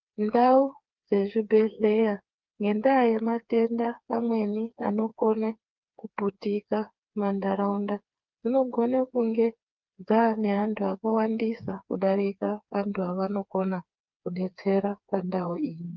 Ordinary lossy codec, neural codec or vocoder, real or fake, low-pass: Opus, 24 kbps; codec, 16 kHz, 4 kbps, FreqCodec, smaller model; fake; 7.2 kHz